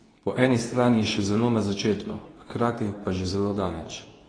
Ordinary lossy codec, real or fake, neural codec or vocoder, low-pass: AAC, 32 kbps; fake; codec, 24 kHz, 0.9 kbps, WavTokenizer, medium speech release version 2; 9.9 kHz